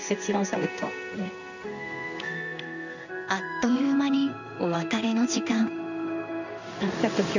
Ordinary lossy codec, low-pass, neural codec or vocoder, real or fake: none; 7.2 kHz; codec, 16 kHz in and 24 kHz out, 1 kbps, XY-Tokenizer; fake